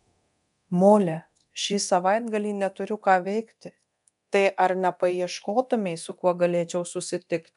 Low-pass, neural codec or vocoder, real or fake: 10.8 kHz; codec, 24 kHz, 0.9 kbps, DualCodec; fake